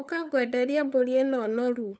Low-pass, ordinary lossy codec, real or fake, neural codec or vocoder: none; none; fake; codec, 16 kHz, 8 kbps, FunCodec, trained on LibriTTS, 25 frames a second